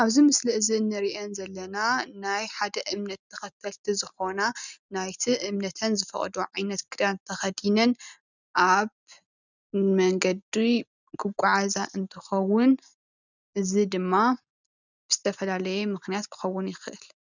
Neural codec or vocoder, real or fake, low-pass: none; real; 7.2 kHz